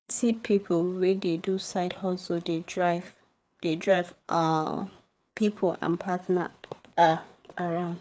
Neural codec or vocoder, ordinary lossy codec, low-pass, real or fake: codec, 16 kHz, 4 kbps, FreqCodec, larger model; none; none; fake